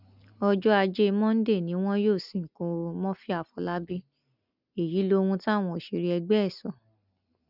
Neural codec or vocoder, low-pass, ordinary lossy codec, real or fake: none; 5.4 kHz; none; real